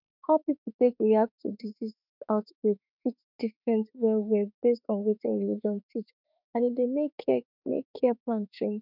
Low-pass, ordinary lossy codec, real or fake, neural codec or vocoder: 5.4 kHz; none; fake; autoencoder, 48 kHz, 32 numbers a frame, DAC-VAE, trained on Japanese speech